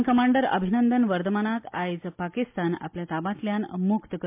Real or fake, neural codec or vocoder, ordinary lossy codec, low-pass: real; none; MP3, 32 kbps; 3.6 kHz